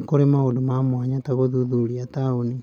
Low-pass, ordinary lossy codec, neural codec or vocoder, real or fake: 19.8 kHz; none; none; real